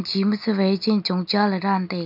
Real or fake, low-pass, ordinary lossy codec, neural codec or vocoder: real; 5.4 kHz; AAC, 48 kbps; none